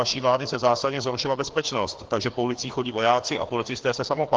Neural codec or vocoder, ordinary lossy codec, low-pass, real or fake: codec, 16 kHz, 2 kbps, FreqCodec, larger model; Opus, 16 kbps; 7.2 kHz; fake